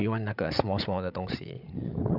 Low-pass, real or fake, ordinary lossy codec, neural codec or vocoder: 5.4 kHz; fake; none; codec, 16 kHz, 8 kbps, FreqCodec, larger model